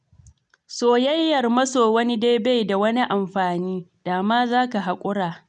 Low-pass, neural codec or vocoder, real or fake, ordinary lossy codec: 9.9 kHz; none; real; none